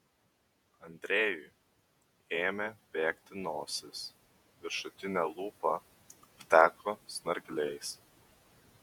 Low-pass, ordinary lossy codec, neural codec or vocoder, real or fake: 19.8 kHz; MP3, 96 kbps; vocoder, 48 kHz, 128 mel bands, Vocos; fake